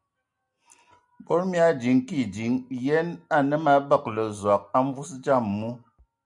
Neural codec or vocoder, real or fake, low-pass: none; real; 10.8 kHz